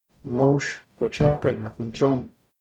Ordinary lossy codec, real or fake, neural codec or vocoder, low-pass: none; fake; codec, 44.1 kHz, 0.9 kbps, DAC; 19.8 kHz